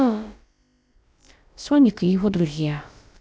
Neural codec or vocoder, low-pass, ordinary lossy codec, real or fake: codec, 16 kHz, about 1 kbps, DyCAST, with the encoder's durations; none; none; fake